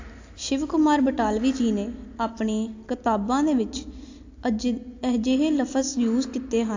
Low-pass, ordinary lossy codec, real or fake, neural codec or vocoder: 7.2 kHz; AAC, 48 kbps; real; none